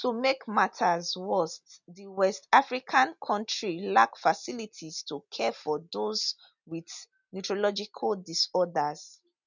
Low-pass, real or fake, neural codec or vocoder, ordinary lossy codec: 7.2 kHz; real; none; none